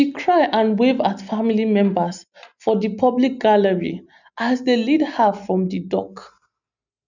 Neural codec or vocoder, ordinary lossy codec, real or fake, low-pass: none; none; real; 7.2 kHz